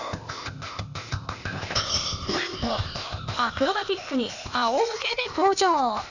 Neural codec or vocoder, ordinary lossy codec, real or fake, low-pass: codec, 16 kHz, 0.8 kbps, ZipCodec; none; fake; 7.2 kHz